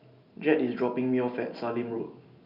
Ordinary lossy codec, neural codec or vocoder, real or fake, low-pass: Opus, 64 kbps; none; real; 5.4 kHz